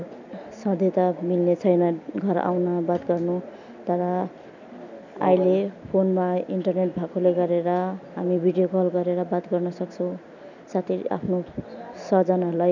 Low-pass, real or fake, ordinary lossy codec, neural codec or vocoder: 7.2 kHz; real; none; none